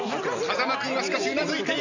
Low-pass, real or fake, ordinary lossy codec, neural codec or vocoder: 7.2 kHz; real; none; none